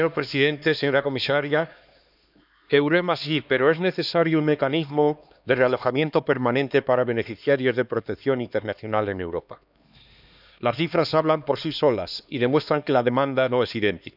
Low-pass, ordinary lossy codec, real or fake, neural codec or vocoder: 5.4 kHz; none; fake; codec, 16 kHz, 2 kbps, X-Codec, HuBERT features, trained on LibriSpeech